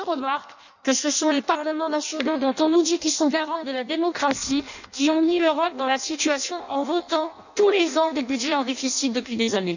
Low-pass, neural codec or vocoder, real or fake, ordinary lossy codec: 7.2 kHz; codec, 16 kHz in and 24 kHz out, 0.6 kbps, FireRedTTS-2 codec; fake; none